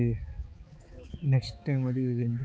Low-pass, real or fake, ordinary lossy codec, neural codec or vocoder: none; fake; none; codec, 16 kHz, 4 kbps, X-Codec, HuBERT features, trained on balanced general audio